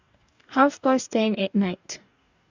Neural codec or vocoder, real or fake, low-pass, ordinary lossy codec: codec, 44.1 kHz, 2.6 kbps, DAC; fake; 7.2 kHz; none